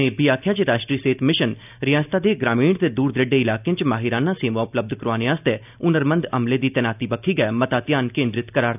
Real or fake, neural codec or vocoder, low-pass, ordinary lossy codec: real; none; 3.6 kHz; none